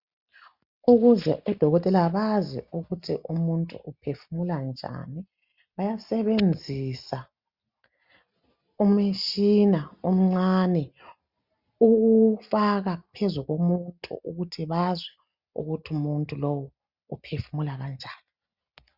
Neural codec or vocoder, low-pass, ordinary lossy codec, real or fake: none; 5.4 kHz; Opus, 64 kbps; real